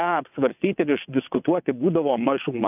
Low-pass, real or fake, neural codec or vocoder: 3.6 kHz; fake; vocoder, 22.05 kHz, 80 mel bands, WaveNeXt